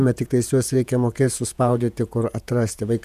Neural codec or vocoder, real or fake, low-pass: vocoder, 48 kHz, 128 mel bands, Vocos; fake; 14.4 kHz